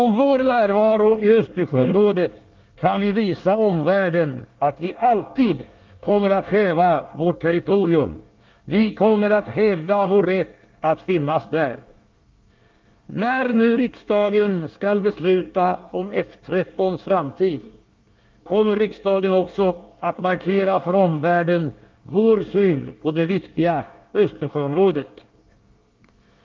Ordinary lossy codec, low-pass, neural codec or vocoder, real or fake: Opus, 24 kbps; 7.2 kHz; codec, 24 kHz, 1 kbps, SNAC; fake